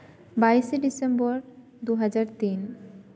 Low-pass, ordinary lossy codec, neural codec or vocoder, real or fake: none; none; none; real